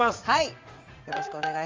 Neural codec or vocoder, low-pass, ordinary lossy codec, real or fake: vocoder, 44.1 kHz, 80 mel bands, Vocos; 7.2 kHz; Opus, 32 kbps; fake